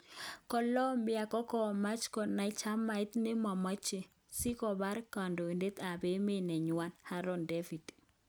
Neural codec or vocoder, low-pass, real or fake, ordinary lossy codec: none; none; real; none